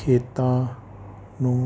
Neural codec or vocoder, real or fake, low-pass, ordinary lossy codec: none; real; none; none